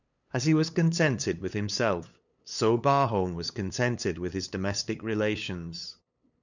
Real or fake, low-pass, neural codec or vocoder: fake; 7.2 kHz; codec, 16 kHz, 8 kbps, FunCodec, trained on LibriTTS, 25 frames a second